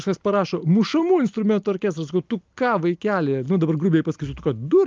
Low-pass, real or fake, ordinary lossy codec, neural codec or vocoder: 7.2 kHz; real; Opus, 24 kbps; none